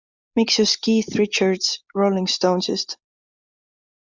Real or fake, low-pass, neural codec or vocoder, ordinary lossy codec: real; 7.2 kHz; none; MP3, 64 kbps